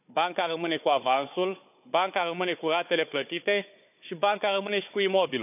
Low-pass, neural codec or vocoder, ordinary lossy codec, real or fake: 3.6 kHz; codec, 16 kHz, 4 kbps, FunCodec, trained on Chinese and English, 50 frames a second; none; fake